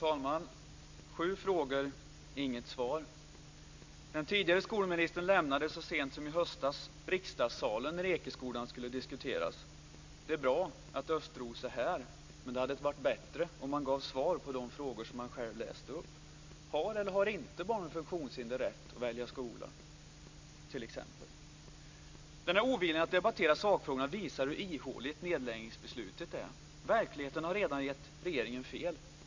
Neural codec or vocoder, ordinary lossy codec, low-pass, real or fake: none; MP3, 64 kbps; 7.2 kHz; real